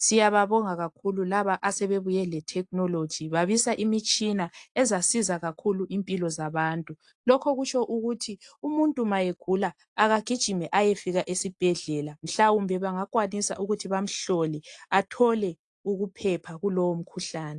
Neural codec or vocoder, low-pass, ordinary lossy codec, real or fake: none; 10.8 kHz; AAC, 64 kbps; real